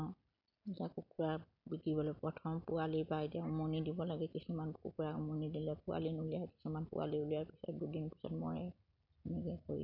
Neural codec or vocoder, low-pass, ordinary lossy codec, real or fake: none; 5.4 kHz; none; real